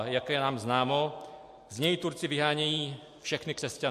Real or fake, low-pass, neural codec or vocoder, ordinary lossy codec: real; 14.4 kHz; none; MP3, 64 kbps